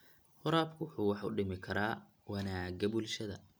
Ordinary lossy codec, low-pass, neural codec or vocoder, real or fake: none; none; none; real